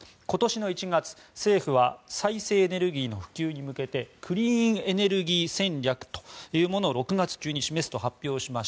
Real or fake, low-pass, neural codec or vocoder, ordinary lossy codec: real; none; none; none